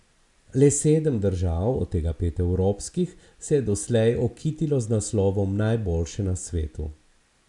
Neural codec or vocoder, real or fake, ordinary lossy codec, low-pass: none; real; none; 10.8 kHz